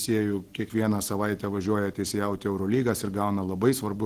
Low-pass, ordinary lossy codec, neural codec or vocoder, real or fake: 14.4 kHz; Opus, 16 kbps; none; real